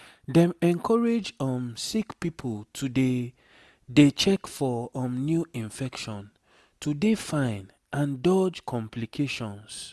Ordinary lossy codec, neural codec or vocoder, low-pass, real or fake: none; none; none; real